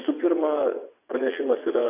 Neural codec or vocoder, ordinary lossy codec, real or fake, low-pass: vocoder, 22.05 kHz, 80 mel bands, WaveNeXt; AAC, 24 kbps; fake; 3.6 kHz